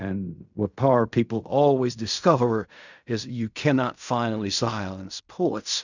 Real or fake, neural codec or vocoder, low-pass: fake; codec, 16 kHz in and 24 kHz out, 0.4 kbps, LongCat-Audio-Codec, fine tuned four codebook decoder; 7.2 kHz